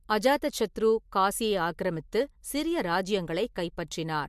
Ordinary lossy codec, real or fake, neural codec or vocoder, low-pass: MP3, 64 kbps; real; none; 14.4 kHz